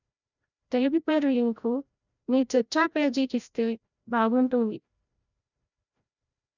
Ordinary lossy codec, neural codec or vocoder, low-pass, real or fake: none; codec, 16 kHz, 0.5 kbps, FreqCodec, larger model; 7.2 kHz; fake